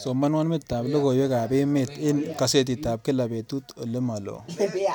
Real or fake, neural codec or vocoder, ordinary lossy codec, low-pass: real; none; none; none